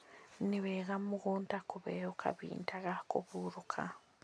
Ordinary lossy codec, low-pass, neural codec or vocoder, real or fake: none; none; none; real